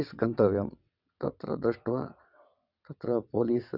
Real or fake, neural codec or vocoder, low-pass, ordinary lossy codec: fake; vocoder, 22.05 kHz, 80 mel bands, WaveNeXt; 5.4 kHz; none